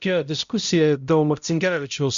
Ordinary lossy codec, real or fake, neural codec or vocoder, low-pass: Opus, 64 kbps; fake; codec, 16 kHz, 0.5 kbps, X-Codec, HuBERT features, trained on balanced general audio; 7.2 kHz